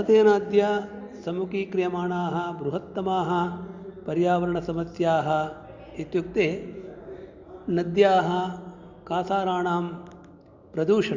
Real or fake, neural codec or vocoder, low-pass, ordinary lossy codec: real; none; 7.2 kHz; Opus, 64 kbps